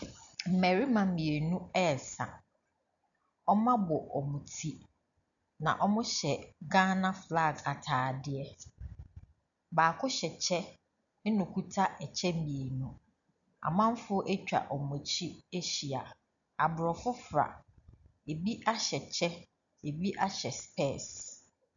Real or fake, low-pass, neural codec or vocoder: real; 7.2 kHz; none